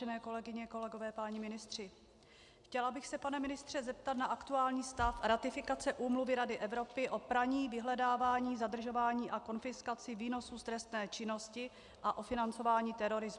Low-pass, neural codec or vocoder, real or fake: 10.8 kHz; none; real